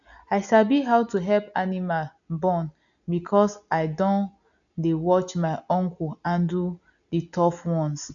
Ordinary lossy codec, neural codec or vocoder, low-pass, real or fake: none; none; 7.2 kHz; real